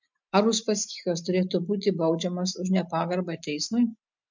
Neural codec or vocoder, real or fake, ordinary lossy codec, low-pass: none; real; MP3, 64 kbps; 7.2 kHz